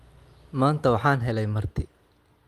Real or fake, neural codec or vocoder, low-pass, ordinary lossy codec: real; none; 14.4 kHz; Opus, 32 kbps